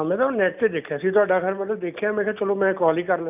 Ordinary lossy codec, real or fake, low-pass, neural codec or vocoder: none; real; 3.6 kHz; none